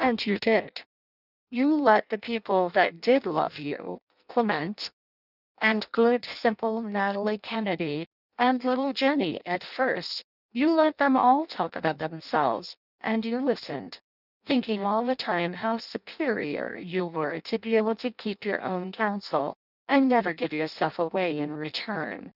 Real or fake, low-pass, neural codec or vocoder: fake; 5.4 kHz; codec, 16 kHz in and 24 kHz out, 0.6 kbps, FireRedTTS-2 codec